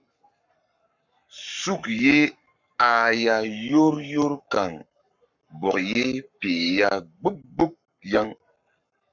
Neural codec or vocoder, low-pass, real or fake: codec, 44.1 kHz, 7.8 kbps, Pupu-Codec; 7.2 kHz; fake